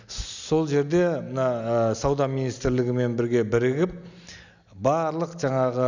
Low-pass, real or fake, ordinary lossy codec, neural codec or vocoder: 7.2 kHz; real; none; none